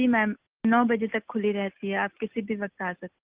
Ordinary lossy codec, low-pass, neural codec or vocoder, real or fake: Opus, 24 kbps; 3.6 kHz; none; real